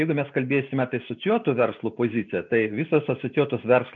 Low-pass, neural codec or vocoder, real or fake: 7.2 kHz; none; real